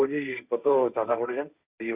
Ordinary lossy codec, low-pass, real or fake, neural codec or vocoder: Opus, 64 kbps; 3.6 kHz; fake; codec, 16 kHz, 1.1 kbps, Voila-Tokenizer